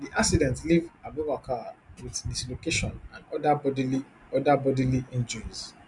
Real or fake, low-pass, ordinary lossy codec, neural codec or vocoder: real; 10.8 kHz; none; none